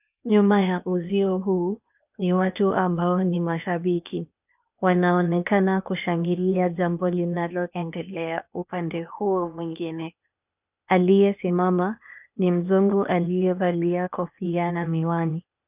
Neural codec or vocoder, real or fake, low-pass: codec, 16 kHz, 0.8 kbps, ZipCodec; fake; 3.6 kHz